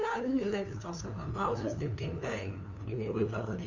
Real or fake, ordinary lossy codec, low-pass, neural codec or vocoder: fake; none; 7.2 kHz; codec, 16 kHz, 2 kbps, FunCodec, trained on LibriTTS, 25 frames a second